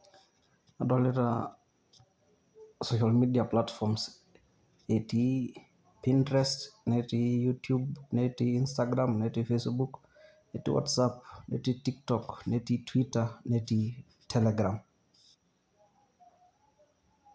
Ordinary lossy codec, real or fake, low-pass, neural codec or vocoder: none; real; none; none